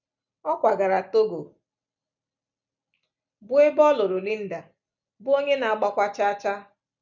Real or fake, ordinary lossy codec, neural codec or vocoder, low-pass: real; none; none; 7.2 kHz